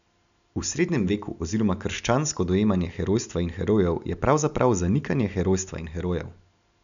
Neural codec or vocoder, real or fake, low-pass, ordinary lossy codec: none; real; 7.2 kHz; none